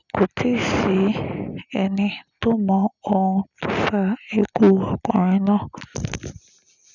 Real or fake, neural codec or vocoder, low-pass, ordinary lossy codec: real; none; 7.2 kHz; none